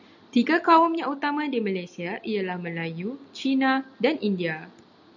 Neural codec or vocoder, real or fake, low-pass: none; real; 7.2 kHz